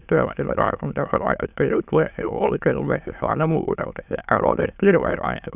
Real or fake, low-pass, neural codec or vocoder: fake; 3.6 kHz; autoencoder, 22.05 kHz, a latent of 192 numbers a frame, VITS, trained on many speakers